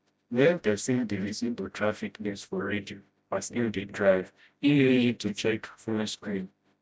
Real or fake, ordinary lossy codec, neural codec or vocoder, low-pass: fake; none; codec, 16 kHz, 0.5 kbps, FreqCodec, smaller model; none